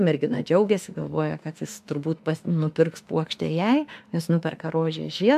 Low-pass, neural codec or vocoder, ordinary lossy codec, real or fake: 14.4 kHz; autoencoder, 48 kHz, 32 numbers a frame, DAC-VAE, trained on Japanese speech; MP3, 96 kbps; fake